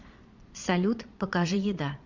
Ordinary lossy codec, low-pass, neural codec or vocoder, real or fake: MP3, 64 kbps; 7.2 kHz; vocoder, 22.05 kHz, 80 mel bands, Vocos; fake